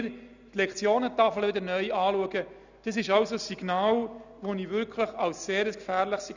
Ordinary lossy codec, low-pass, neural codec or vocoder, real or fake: none; 7.2 kHz; none; real